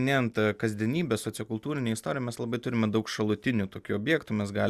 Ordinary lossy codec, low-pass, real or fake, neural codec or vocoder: Opus, 64 kbps; 14.4 kHz; real; none